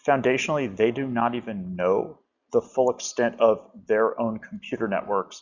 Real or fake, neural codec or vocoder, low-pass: real; none; 7.2 kHz